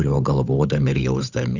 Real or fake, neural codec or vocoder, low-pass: fake; codec, 16 kHz, 8 kbps, FunCodec, trained on Chinese and English, 25 frames a second; 7.2 kHz